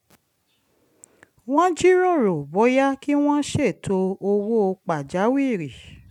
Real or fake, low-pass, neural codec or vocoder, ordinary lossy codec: real; 19.8 kHz; none; none